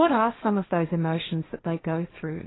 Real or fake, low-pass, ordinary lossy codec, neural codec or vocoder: fake; 7.2 kHz; AAC, 16 kbps; codec, 16 kHz, 1.1 kbps, Voila-Tokenizer